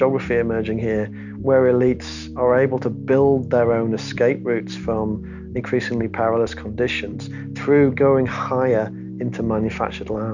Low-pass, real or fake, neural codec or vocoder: 7.2 kHz; real; none